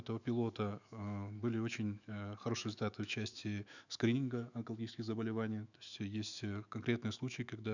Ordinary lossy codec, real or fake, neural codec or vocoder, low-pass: none; fake; autoencoder, 48 kHz, 128 numbers a frame, DAC-VAE, trained on Japanese speech; 7.2 kHz